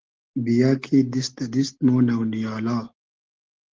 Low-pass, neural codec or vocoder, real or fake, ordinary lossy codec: 7.2 kHz; none; real; Opus, 16 kbps